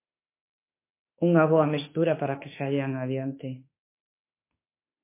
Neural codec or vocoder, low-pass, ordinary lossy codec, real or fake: autoencoder, 48 kHz, 32 numbers a frame, DAC-VAE, trained on Japanese speech; 3.6 kHz; MP3, 24 kbps; fake